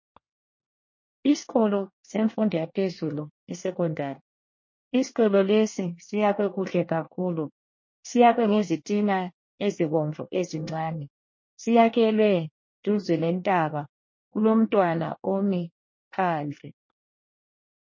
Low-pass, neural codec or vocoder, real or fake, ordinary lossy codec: 7.2 kHz; codec, 24 kHz, 1 kbps, SNAC; fake; MP3, 32 kbps